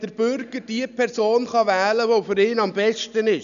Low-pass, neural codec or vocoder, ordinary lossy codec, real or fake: 7.2 kHz; none; none; real